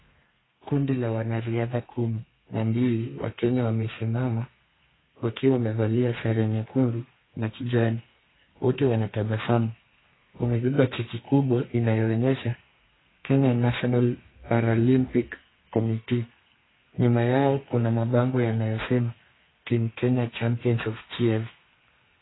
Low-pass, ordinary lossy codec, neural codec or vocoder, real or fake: 7.2 kHz; AAC, 16 kbps; codec, 32 kHz, 1.9 kbps, SNAC; fake